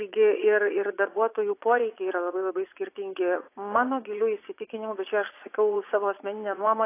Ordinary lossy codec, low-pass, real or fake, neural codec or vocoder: AAC, 24 kbps; 3.6 kHz; fake; vocoder, 22.05 kHz, 80 mel bands, Vocos